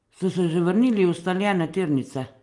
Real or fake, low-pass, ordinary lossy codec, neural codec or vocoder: real; 10.8 kHz; Opus, 32 kbps; none